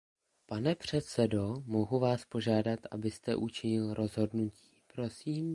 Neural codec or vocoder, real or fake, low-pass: none; real; 10.8 kHz